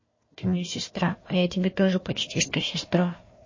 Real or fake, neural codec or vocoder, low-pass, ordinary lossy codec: fake; codec, 16 kHz, 1 kbps, FunCodec, trained on Chinese and English, 50 frames a second; 7.2 kHz; MP3, 32 kbps